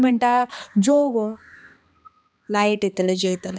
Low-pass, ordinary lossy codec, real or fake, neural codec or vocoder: none; none; fake; codec, 16 kHz, 2 kbps, X-Codec, HuBERT features, trained on balanced general audio